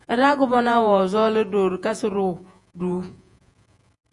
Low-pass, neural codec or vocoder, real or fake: 10.8 kHz; vocoder, 48 kHz, 128 mel bands, Vocos; fake